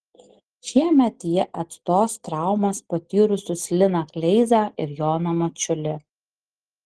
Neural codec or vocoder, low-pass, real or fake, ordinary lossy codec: none; 10.8 kHz; real; Opus, 16 kbps